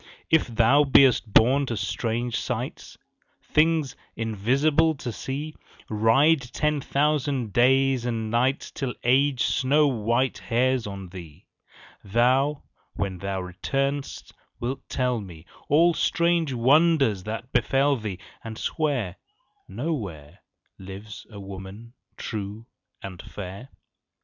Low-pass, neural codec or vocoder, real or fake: 7.2 kHz; none; real